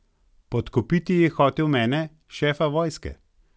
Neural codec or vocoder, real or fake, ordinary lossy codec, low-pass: none; real; none; none